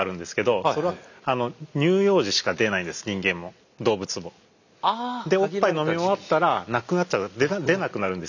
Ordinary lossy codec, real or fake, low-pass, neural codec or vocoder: none; real; 7.2 kHz; none